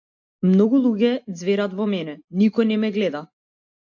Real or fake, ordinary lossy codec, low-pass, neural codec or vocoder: real; AAC, 48 kbps; 7.2 kHz; none